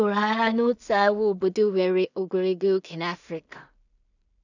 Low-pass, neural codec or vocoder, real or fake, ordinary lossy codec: 7.2 kHz; codec, 16 kHz in and 24 kHz out, 0.4 kbps, LongCat-Audio-Codec, two codebook decoder; fake; none